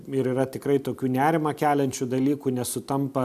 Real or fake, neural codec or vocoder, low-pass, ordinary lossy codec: real; none; 14.4 kHz; AAC, 96 kbps